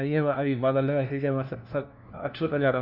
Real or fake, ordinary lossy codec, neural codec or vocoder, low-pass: fake; none; codec, 16 kHz, 1 kbps, FunCodec, trained on LibriTTS, 50 frames a second; 5.4 kHz